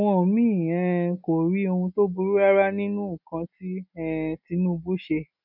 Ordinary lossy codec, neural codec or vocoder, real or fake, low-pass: none; none; real; 5.4 kHz